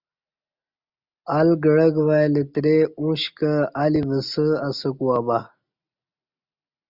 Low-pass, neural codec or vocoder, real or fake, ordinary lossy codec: 5.4 kHz; none; real; Opus, 64 kbps